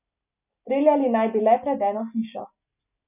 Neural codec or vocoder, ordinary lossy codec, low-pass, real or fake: none; none; 3.6 kHz; real